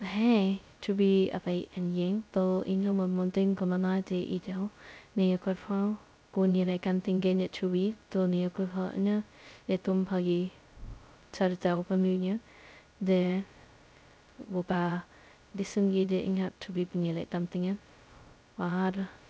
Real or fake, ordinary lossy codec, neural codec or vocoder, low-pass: fake; none; codec, 16 kHz, 0.2 kbps, FocalCodec; none